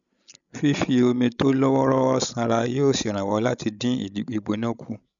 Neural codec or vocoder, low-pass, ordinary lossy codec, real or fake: codec, 16 kHz, 16 kbps, FreqCodec, larger model; 7.2 kHz; none; fake